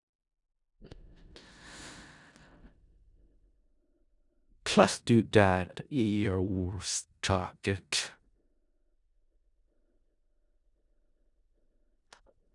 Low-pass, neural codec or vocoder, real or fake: 10.8 kHz; codec, 16 kHz in and 24 kHz out, 0.4 kbps, LongCat-Audio-Codec, four codebook decoder; fake